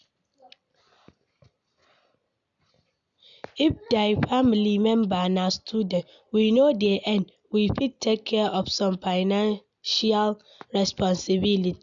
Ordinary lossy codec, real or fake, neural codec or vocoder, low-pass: none; real; none; 7.2 kHz